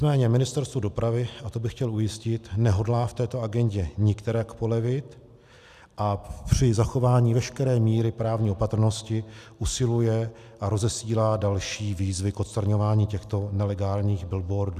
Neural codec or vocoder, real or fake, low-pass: none; real; 14.4 kHz